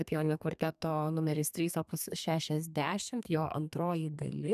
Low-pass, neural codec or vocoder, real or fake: 14.4 kHz; codec, 32 kHz, 1.9 kbps, SNAC; fake